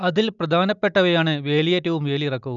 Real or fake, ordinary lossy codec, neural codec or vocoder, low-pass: real; none; none; 7.2 kHz